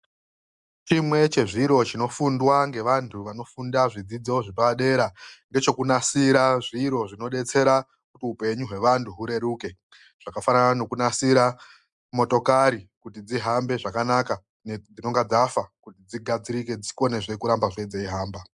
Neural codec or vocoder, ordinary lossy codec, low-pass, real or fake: none; MP3, 96 kbps; 10.8 kHz; real